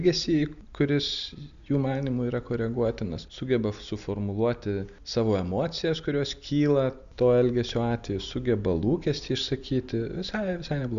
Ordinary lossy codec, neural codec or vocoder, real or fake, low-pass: Opus, 64 kbps; none; real; 7.2 kHz